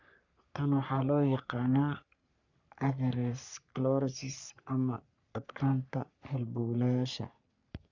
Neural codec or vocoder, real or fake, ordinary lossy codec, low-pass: codec, 44.1 kHz, 3.4 kbps, Pupu-Codec; fake; none; 7.2 kHz